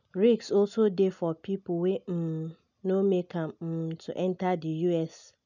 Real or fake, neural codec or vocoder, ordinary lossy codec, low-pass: real; none; none; 7.2 kHz